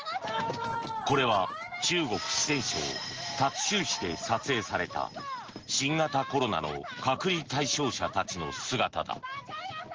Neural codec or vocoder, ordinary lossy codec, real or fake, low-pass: none; Opus, 16 kbps; real; 7.2 kHz